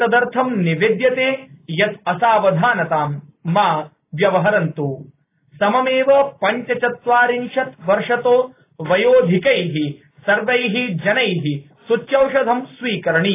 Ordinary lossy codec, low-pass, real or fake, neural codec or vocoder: AAC, 24 kbps; 3.6 kHz; real; none